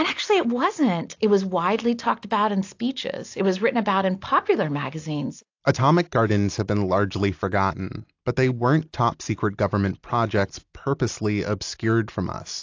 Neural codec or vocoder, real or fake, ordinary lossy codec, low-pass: none; real; AAC, 48 kbps; 7.2 kHz